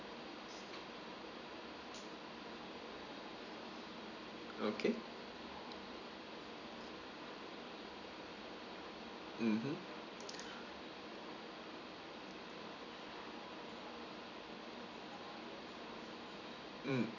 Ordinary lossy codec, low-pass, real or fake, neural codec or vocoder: none; 7.2 kHz; fake; vocoder, 44.1 kHz, 128 mel bands every 256 samples, BigVGAN v2